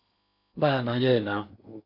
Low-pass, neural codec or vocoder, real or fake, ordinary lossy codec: 5.4 kHz; codec, 16 kHz in and 24 kHz out, 0.8 kbps, FocalCodec, streaming, 65536 codes; fake; MP3, 48 kbps